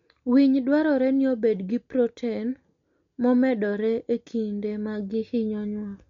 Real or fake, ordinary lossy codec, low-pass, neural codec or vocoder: real; MP3, 48 kbps; 7.2 kHz; none